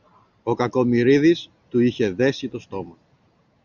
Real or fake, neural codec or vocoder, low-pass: real; none; 7.2 kHz